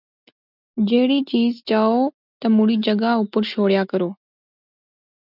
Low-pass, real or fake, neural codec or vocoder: 5.4 kHz; real; none